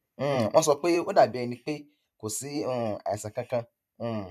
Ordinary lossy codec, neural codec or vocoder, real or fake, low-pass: none; vocoder, 44.1 kHz, 128 mel bands every 512 samples, BigVGAN v2; fake; 14.4 kHz